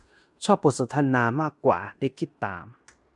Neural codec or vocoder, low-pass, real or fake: codec, 16 kHz in and 24 kHz out, 0.9 kbps, LongCat-Audio-Codec, fine tuned four codebook decoder; 10.8 kHz; fake